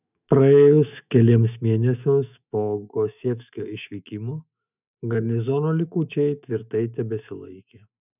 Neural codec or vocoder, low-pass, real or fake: autoencoder, 48 kHz, 128 numbers a frame, DAC-VAE, trained on Japanese speech; 3.6 kHz; fake